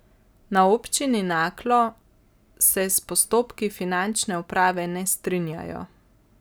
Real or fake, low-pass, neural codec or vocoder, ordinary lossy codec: real; none; none; none